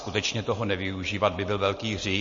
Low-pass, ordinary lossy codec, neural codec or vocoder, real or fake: 7.2 kHz; MP3, 32 kbps; none; real